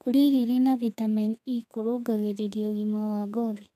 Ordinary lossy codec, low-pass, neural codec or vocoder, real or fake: none; 14.4 kHz; codec, 32 kHz, 1.9 kbps, SNAC; fake